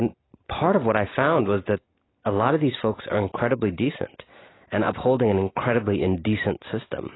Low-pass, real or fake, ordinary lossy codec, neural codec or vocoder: 7.2 kHz; real; AAC, 16 kbps; none